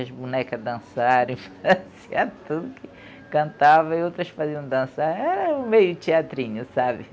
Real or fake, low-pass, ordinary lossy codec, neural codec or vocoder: real; none; none; none